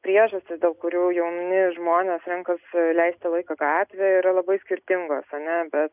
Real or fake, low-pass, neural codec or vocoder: real; 3.6 kHz; none